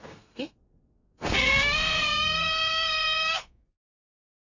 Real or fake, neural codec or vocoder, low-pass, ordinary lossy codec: fake; codec, 32 kHz, 1.9 kbps, SNAC; 7.2 kHz; none